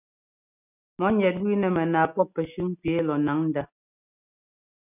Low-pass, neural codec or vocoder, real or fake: 3.6 kHz; none; real